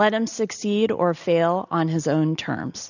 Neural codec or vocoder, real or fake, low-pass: none; real; 7.2 kHz